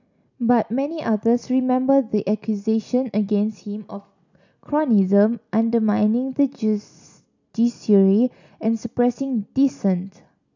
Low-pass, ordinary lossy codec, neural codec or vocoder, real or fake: 7.2 kHz; none; none; real